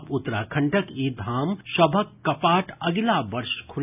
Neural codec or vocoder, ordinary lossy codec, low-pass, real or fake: none; none; 3.6 kHz; real